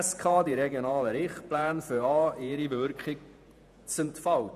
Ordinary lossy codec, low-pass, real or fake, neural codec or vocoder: none; 14.4 kHz; fake; vocoder, 48 kHz, 128 mel bands, Vocos